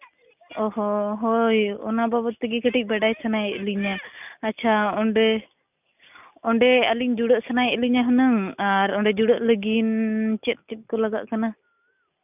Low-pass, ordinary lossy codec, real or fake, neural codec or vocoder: 3.6 kHz; none; real; none